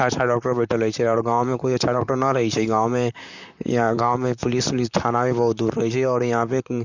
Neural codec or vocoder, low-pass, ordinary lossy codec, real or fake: vocoder, 44.1 kHz, 128 mel bands, Pupu-Vocoder; 7.2 kHz; none; fake